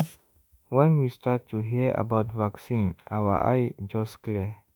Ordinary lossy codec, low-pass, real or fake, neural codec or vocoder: none; none; fake; autoencoder, 48 kHz, 32 numbers a frame, DAC-VAE, trained on Japanese speech